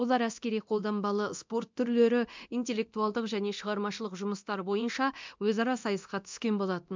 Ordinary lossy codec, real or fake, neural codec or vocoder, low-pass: MP3, 64 kbps; fake; codec, 24 kHz, 0.9 kbps, DualCodec; 7.2 kHz